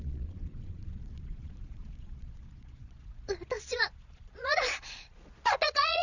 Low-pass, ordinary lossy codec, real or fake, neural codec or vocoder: 7.2 kHz; none; fake; vocoder, 22.05 kHz, 80 mel bands, Vocos